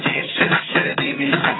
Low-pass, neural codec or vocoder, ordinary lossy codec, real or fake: 7.2 kHz; vocoder, 22.05 kHz, 80 mel bands, HiFi-GAN; AAC, 16 kbps; fake